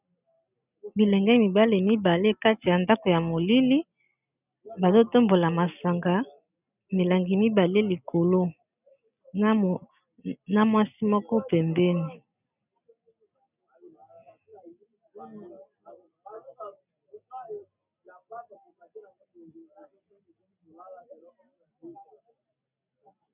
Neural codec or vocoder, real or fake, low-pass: none; real; 3.6 kHz